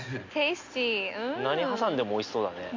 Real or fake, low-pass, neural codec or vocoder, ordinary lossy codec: real; 7.2 kHz; none; MP3, 48 kbps